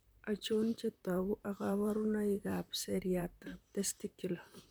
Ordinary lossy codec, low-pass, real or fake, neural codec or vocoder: none; none; fake; vocoder, 44.1 kHz, 128 mel bands, Pupu-Vocoder